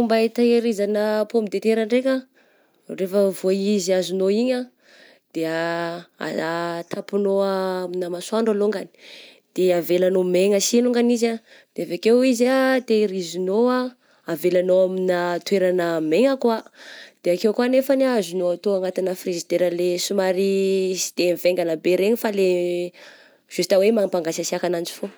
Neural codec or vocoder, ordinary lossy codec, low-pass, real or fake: none; none; none; real